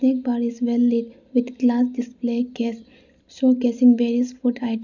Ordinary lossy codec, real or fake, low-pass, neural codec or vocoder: none; real; 7.2 kHz; none